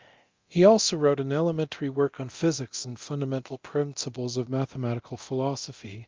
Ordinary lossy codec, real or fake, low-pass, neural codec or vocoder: Opus, 32 kbps; fake; 7.2 kHz; codec, 24 kHz, 0.9 kbps, DualCodec